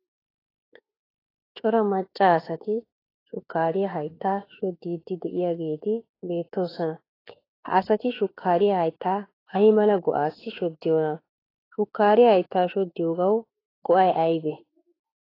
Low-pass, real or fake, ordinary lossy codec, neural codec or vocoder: 5.4 kHz; fake; AAC, 24 kbps; autoencoder, 48 kHz, 32 numbers a frame, DAC-VAE, trained on Japanese speech